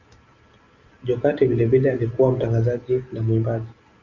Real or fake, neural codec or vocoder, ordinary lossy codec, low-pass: real; none; Opus, 64 kbps; 7.2 kHz